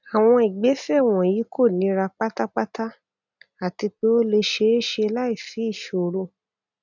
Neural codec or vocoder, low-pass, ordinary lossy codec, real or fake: none; 7.2 kHz; none; real